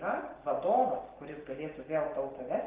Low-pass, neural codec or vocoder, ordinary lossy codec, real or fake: 3.6 kHz; none; Opus, 16 kbps; real